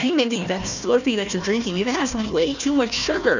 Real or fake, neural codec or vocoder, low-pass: fake; codec, 16 kHz, 1 kbps, FunCodec, trained on Chinese and English, 50 frames a second; 7.2 kHz